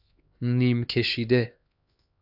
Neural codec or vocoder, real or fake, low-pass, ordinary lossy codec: codec, 16 kHz, 2 kbps, X-Codec, HuBERT features, trained on LibriSpeech; fake; 5.4 kHz; AAC, 48 kbps